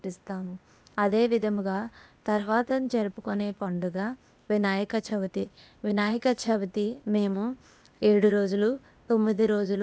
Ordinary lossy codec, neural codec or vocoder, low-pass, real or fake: none; codec, 16 kHz, 0.8 kbps, ZipCodec; none; fake